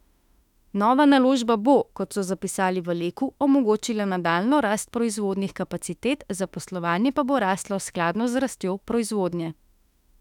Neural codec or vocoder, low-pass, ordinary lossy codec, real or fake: autoencoder, 48 kHz, 32 numbers a frame, DAC-VAE, trained on Japanese speech; 19.8 kHz; none; fake